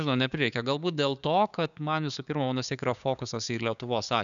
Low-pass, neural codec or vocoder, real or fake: 7.2 kHz; codec, 16 kHz, 6 kbps, DAC; fake